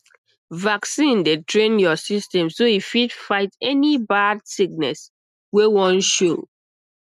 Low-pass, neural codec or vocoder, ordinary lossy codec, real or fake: 14.4 kHz; none; none; real